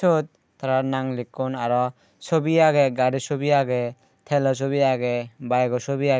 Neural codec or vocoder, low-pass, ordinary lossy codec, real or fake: none; none; none; real